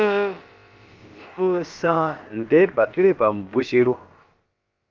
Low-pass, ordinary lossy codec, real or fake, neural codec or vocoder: 7.2 kHz; Opus, 32 kbps; fake; codec, 16 kHz, about 1 kbps, DyCAST, with the encoder's durations